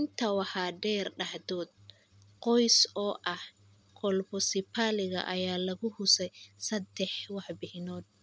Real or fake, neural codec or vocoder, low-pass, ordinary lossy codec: real; none; none; none